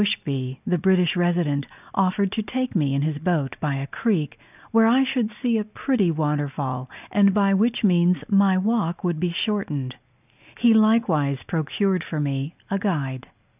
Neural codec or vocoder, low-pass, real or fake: none; 3.6 kHz; real